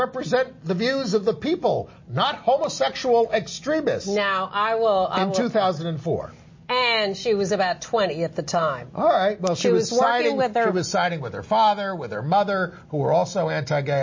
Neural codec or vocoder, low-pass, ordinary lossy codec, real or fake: none; 7.2 kHz; MP3, 32 kbps; real